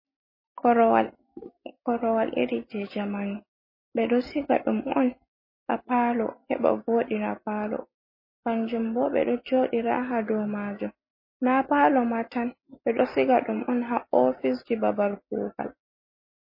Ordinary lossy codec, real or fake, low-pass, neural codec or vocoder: MP3, 24 kbps; real; 5.4 kHz; none